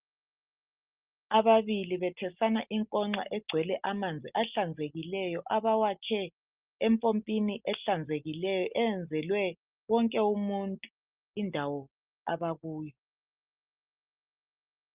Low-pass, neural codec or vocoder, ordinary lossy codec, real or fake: 3.6 kHz; none; Opus, 32 kbps; real